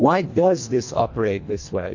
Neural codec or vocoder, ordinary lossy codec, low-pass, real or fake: codec, 24 kHz, 1.5 kbps, HILCodec; AAC, 48 kbps; 7.2 kHz; fake